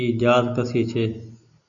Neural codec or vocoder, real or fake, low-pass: none; real; 7.2 kHz